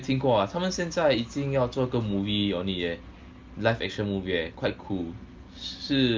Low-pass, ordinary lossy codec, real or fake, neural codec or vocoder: 7.2 kHz; Opus, 24 kbps; real; none